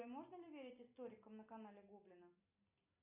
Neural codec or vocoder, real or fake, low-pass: none; real; 3.6 kHz